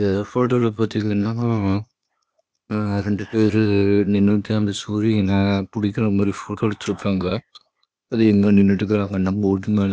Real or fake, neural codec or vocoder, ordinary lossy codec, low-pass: fake; codec, 16 kHz, 0.8 kbps, ZipCodec; none; none